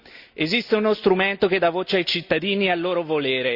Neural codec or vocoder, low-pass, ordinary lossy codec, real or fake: none; 5.4 kHz; Opus, 64 kbps; real